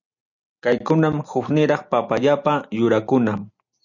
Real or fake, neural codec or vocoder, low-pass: real; none; 7.2 kHz